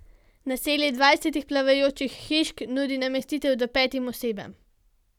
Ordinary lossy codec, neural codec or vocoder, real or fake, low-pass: none; none; real; 19.8 kHz